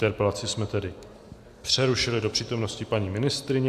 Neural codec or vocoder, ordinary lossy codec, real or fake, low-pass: none; AAC, 96 kbps; real; 14.4 kHz